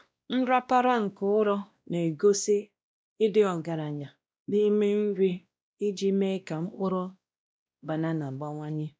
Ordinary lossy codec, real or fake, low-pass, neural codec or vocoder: none; fake; none; codec, 16 kHz, 1 kbps, X-Codec, WavLM features, trained on Multilingual LibriSpeech